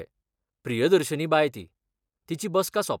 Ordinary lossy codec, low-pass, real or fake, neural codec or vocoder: none; 19.8 kHz; real; none